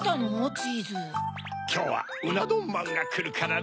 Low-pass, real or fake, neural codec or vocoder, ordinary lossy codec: none; real; none; none